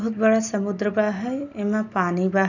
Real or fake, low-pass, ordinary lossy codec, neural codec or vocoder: real; 7.2 kHz; none; none